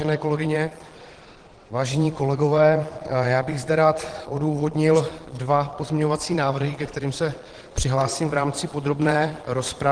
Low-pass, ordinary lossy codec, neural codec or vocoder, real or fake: 9.9 kHz; Opus, 16 kbps; vocoder, 22.05 kHz, 80 mel bands, Vocos; fake